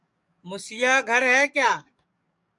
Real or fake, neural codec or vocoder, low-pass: fake; codec, 44.1 kHz, 7.8 kbps, DAC; 10.8 kHz